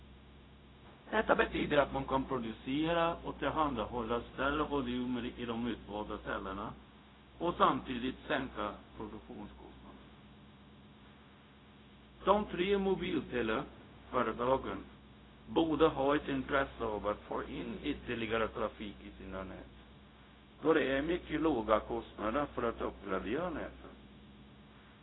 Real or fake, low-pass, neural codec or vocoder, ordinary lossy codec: fake; 7.2 kHz; codec, 16 kHz, 0.4 kbps, LongCat-Audio-Codec; AAC, 16 kbps